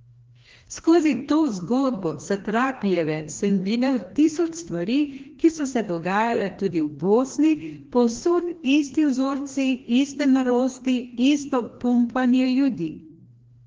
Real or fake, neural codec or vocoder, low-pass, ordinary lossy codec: fake; codec, 16 kHz, 1 kbps, FreqCodec, larger model; 7.2 kHz; Opus, 16 kbps